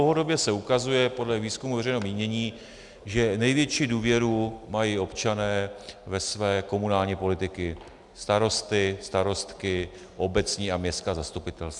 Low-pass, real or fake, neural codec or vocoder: 10.8 kHz; real; none